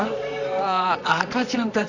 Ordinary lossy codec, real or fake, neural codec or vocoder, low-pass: none; fake; codec, 24 kHz, 0.9 kbps, WavTokenizer, medium music audio release; 7.2 kHz